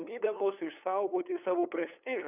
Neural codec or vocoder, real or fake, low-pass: codec, 16 kHz, 8 kbps, FunCodec, trained on LibriTTS, 25 frames a second; fake; 3.6 kHz